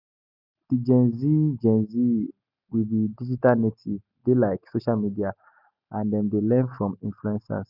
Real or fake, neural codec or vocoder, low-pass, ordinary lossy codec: real; none; 5.4 kHz; none